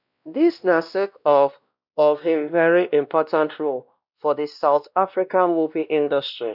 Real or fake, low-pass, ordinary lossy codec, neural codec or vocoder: fake; 5.4 kHz; none; codec, 16 kHz, 1 kbps, X-Codec, WavLM features, trained on Multilingual LibriSpeech